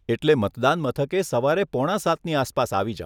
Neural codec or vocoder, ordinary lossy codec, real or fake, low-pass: none; none; real; none